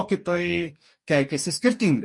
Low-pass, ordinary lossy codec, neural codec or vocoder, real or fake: 10.8 kHz; MP3, 48 kbps; codec, 44.1 kHz, 2.6 kbps, DAC; fake